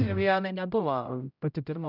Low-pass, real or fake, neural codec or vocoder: 5.4 kHz; fake; codec, 16 kHz, 0.5 kbps, X-Codec, HuBERT features, trained on general audio